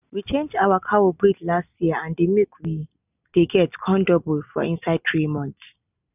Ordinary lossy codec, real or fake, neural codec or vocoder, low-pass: none; real; none; 3.6 kHz